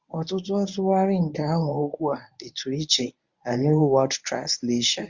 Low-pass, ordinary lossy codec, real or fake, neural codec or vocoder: 7.2 kHz; Opus, 64 kbps; fake; codec, 24 kHz, 0.9 kbps, WavTokenizer, medium speech release version 1